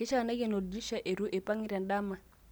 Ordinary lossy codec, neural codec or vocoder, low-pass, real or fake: none; none; none; real